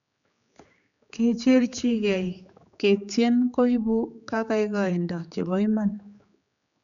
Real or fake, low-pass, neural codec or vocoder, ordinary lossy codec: fake; 7.2 kHz; codec, 16 kHz, 4 kbps, X-Codec, HuBERT features, trained on general audio; none